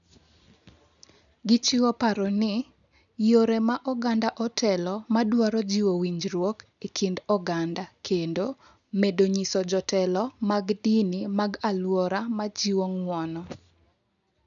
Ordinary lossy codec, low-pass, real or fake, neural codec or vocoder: none; 7.2 kHz; real; none